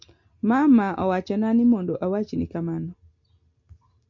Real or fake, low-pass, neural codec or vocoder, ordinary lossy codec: real; 7.2 kHz; none; MP3, 64 kbps